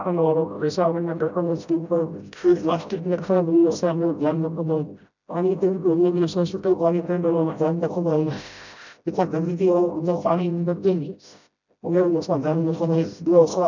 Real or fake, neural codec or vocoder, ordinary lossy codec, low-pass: fake; codec, 16 kHz, 0.5 kbps, FreqCodec, smaller model; none; 7.2 kHz